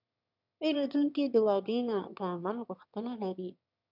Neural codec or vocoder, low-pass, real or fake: autoencoder, 22.05 kHz, a latent of 192 numbers a frame, VITS, trained on one speaker; 5.4 kHz; fake